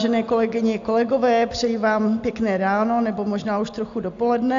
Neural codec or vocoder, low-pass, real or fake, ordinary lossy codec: none; 7.2 kHz; real; AAC, 64 kbps